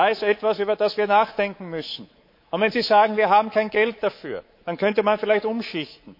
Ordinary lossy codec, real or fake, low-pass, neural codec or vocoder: MP3, 32 kbps; fake; 5.4 kHz; codec, 24 kHz, 3.1 kbps, DualCodec